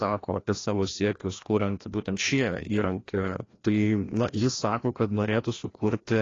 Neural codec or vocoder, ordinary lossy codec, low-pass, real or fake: codec, 16 kHz, 1 kbps, FreqCodec, larger model; AAC, 32 kbps; 7.2 kHz; fake